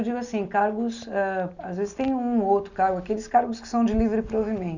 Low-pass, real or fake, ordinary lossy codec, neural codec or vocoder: 7.2 kHz; real; none; none